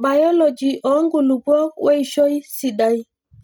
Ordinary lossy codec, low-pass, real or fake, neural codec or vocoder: none; none; real; none